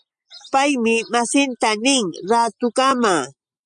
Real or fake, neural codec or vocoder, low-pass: real; none; 9.9 kHz